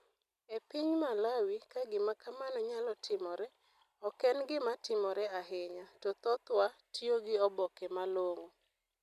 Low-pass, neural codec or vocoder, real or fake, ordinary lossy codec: 14.4 kHz; none; real; none